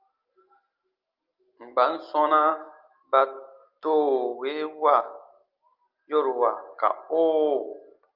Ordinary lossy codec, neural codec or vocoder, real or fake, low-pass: Opus, 24 kbps; none; real; 5.4 kHz